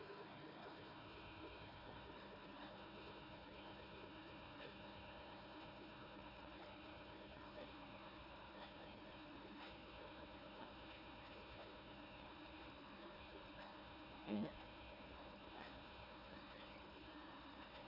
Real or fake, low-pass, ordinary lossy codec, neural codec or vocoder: fake; 5.4 kHz; AAC, 48 kbps; codec, 16 kHz, 1 kbps, FunCodec, trained on Chinese and English, 50 frames a second